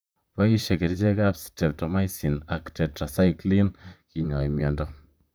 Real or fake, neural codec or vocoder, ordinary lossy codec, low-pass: fake; vocoder, 44.1 kHz, 128 mel bands, Pupu-Vocoder; none; none